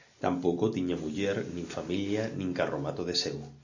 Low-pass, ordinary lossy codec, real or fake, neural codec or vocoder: 7.2 kHz; AAC, 48 kbps; real; none